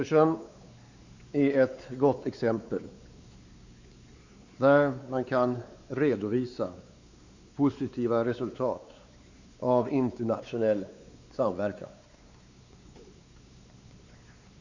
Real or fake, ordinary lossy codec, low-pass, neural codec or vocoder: fake; Opus, 64 kbps; 7.2 kHz; codec, 16 kHz, 4 kbps, X-Codec, WavLM features, trained on Multilingual LibriSpeech